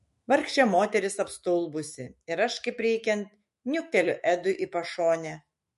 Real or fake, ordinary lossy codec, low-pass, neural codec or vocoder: fake; MP3, 48 kbps; 14.4 kHz; autoencoder, 48 kHz, 128 numbers a frame, DAC-VAE, trained on Japanese speech